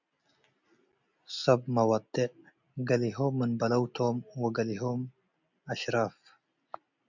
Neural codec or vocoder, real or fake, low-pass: none; real; 7.2 kHz